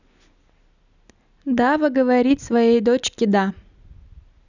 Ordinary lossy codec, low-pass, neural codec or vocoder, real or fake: none; 7.2 kHz; none; real